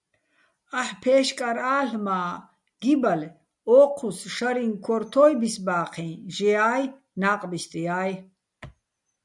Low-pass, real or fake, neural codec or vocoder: 10.8 kHz; real; none